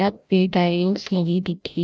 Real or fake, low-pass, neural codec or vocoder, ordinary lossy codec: fake; none; codec, 16 kHz, 0.5 kbps, FreqCodec, larger model; none